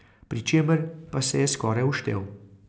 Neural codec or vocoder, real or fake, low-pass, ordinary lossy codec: none; real; none; none